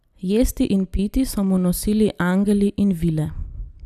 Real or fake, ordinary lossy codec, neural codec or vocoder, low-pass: real; none; none; 14.4 kHz